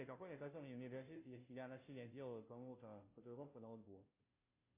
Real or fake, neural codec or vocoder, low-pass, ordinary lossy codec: fake; codec, 16 kHz, 0.5 kbps, FunCodec, trained on Chinese and English, 25 frames a second; 3.6 kHz; MP3, 24 kbps